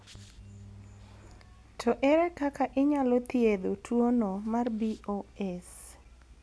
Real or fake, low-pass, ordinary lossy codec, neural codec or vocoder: real; none; none; none